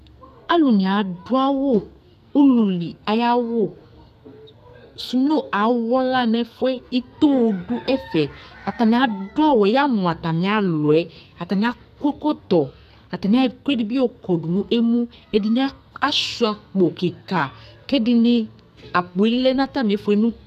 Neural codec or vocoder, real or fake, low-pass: codec, 44.1 kHz, 2.6 kbps, SNAC; fake; 14.4 kHz